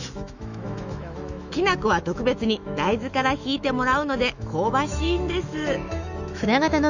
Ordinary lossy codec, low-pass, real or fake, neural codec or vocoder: none; 7.2 kHz; fake; autoencoder, 48 kHz, 128 numbers a frame, DAC-VAE, trained on Japanese speech